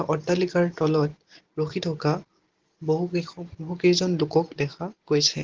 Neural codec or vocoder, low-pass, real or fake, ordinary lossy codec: none; 7.2 kHz; real; Opus, 16 kbps